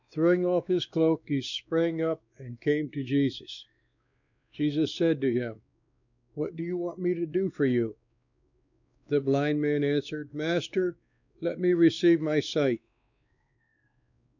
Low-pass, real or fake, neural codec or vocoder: 7.2 kHz; fake; codec, 16 kHz, 2 kbps, X-Codec, WavLM features, trained on Multilingual LibriSpeech